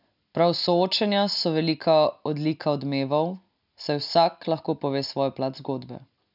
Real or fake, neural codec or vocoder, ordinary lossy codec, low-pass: real; none; none; 5.4 kHz